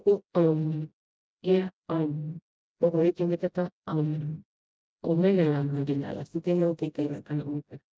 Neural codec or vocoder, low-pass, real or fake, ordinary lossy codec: codec, 16 kHz, 0.5 kbps, FreqCodec, smaller model; none; fake; none